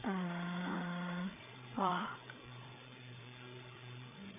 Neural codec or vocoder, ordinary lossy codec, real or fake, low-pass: codec, 16 kHz, 8 kbps, FreqCodec, larger model; none; fake; 3.6 kHz